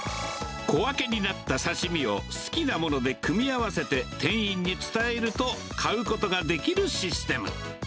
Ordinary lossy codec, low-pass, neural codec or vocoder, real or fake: none; none; none; real